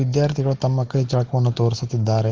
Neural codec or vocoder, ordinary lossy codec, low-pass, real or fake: none; Opus, 16 kbps; 7.2 kHz; real